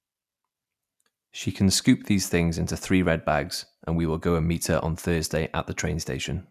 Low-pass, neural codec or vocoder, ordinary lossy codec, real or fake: 14.4 kHz; none; none; real